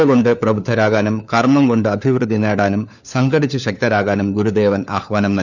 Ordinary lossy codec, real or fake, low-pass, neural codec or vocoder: none; fake; 7.2 kHz; codec, 16 kHz, 4 kbps, FunCodec, trained on LibriTTS, 50 frames a second